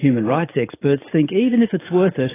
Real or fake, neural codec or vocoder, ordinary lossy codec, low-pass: fake; codec, 44.1 kHz, 7.8 kbps, DAC; AAC, 16 kbps; 3.6 kHz